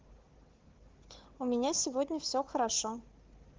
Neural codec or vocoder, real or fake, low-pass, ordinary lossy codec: codec, 16 kHz, 4 kbps, FunCodec, trained on Chinese and English, 50 frames a second; fake; 7.2 kHz; Opus, 16 kbps